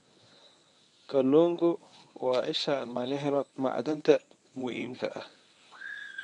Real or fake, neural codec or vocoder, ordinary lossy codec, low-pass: fake; codec, 24 kHz, 0.9 kbps, WavTokenizer, medium speech release version 1; none; 10.8 kHz